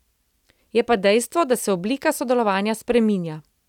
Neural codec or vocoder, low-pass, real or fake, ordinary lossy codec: none; 19.8 kHz; real; none